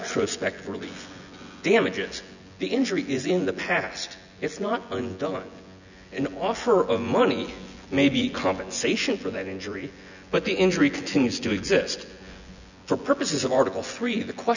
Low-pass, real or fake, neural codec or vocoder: 7.2 kHz; fake; vocoder, 24 kHz, 100 mel bands, Vocos